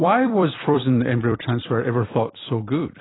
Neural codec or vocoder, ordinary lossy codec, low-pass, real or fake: vocoder, 44.1 kHz, 128 mel bands every 256 samples, BigVGAN v2; AAC, 16 kbps; 7.2 kHz; fake